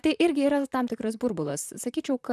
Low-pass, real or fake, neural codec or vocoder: 14.4 kHz; fake; vocoder, 44.1 kHz, 128 mel bands, Pupu-Vocoder